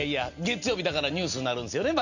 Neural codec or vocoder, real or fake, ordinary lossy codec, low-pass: none; real; none; 7.2 kHz